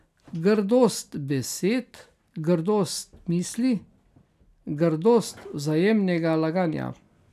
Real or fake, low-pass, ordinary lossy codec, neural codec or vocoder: real; 14.4 kHz; none; none